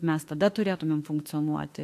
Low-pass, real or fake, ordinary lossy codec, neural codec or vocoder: 14.4 kHz; fake; AAC, 64 kbps; autoencoder, 48 kHz, 32 numbers a frame, DAC-VAE, trained on Japanese speech